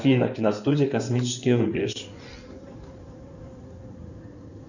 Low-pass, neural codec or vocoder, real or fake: 7.2 kHz; codec, 16 kHz in and 24 kHz out, 2.2 kbps, FireRedTTS-2 codec; fake